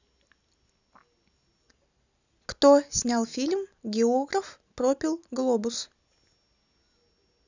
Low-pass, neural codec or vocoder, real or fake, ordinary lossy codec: 7.2 kHz; none; real; none